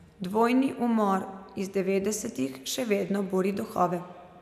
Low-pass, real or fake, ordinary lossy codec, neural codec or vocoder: 14.4 kHz; real; none; none